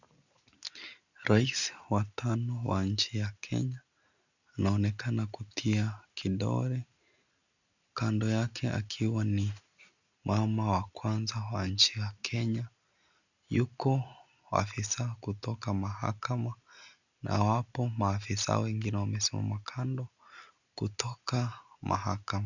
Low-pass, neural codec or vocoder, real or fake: 7.2 kHz; none; real